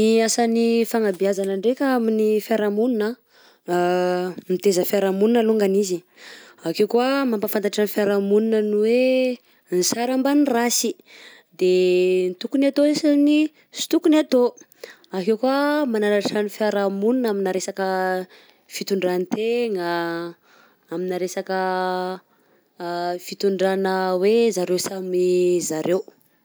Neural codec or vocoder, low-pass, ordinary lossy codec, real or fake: none; none; none; real